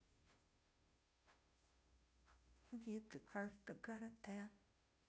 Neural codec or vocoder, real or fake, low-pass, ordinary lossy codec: codec, 16 kHz, 0.5 kbps, FunCodec, trained on Chinese and English, 25 frames a second; fake; none; none